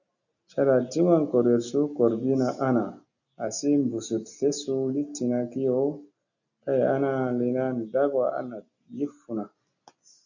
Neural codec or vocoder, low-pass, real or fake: none; 7.2 kHz; real